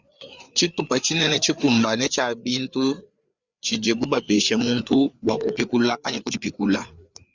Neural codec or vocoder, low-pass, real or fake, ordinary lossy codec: codec, 16 kHz, 4 kbps, FreqCodec, larger model; 7.2 kHz; fake; Opus, 64 kbps